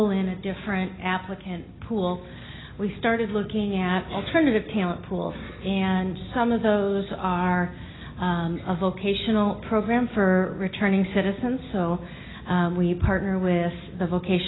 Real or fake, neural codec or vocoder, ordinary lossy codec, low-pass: real; none; AAC, 16 kbps; 7.2 kHz